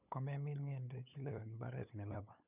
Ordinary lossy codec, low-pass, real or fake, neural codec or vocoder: none; 3.6 kHz; fake; codec, 16 kHz, 8 kbps, FunCodec, trained on LibriTTS, 25 frames a second